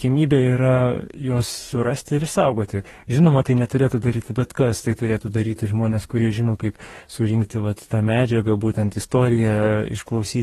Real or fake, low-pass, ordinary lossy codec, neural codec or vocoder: fake; 19.8 kHz; AAC, 32 kbps; codec, 44.1 kHz, 2.6 kbps, DAC